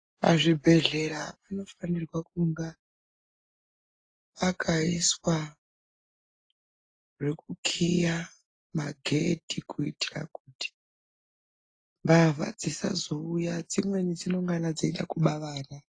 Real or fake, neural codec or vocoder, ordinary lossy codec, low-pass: real; none; AAC, 32 kbps; 9.9 kHz